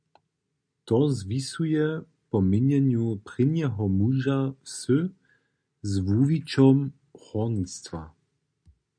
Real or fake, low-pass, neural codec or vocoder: real; 9.9 kHz; none